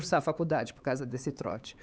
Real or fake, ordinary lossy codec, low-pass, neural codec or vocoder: fake; none; none; codec, 16 kHz, 4 kbps, X-Codec, HuBERT features, trained on LibriSpeech